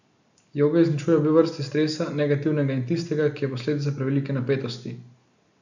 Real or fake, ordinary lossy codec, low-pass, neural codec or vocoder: real; none; 7.2 kHz; none